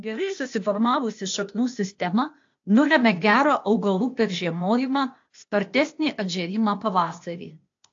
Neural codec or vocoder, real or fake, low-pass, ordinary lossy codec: codec, 16 kHz, 0.8 kbps, ZipCodec; fake; 7.2 kHz; AAC, 48 kbps